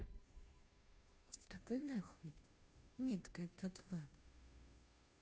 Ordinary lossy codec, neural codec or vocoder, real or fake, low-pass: none; codec, 16 kHz, 0.5 kbps, FunCodec, trained on Chinese and English, 25 frames a second; fake; none